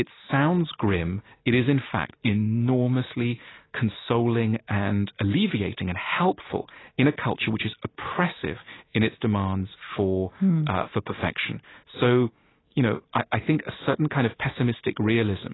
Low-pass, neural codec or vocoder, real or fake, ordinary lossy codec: 7.2 kHz; none; real; AAC, 16 kbps